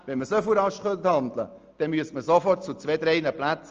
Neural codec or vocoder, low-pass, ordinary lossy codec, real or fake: none; 7.2 kHz; Opus, 24 kbps; real